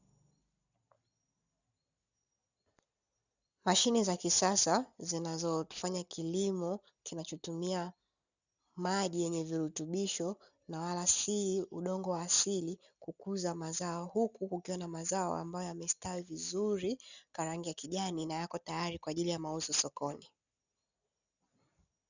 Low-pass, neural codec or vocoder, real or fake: 7.2 kHz; none; real